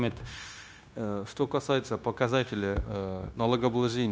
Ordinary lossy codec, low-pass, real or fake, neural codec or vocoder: none; none; fake; codec, 16 kHz, 0.9 kbps, LongCat-Audio-Codec